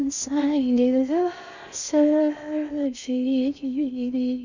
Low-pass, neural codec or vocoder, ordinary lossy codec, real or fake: 7.2 kHz; codec, 16 kHz in and 24 kHz out, 0.6 kbps, FocalCodec, streaming, 2048 codes; none; fake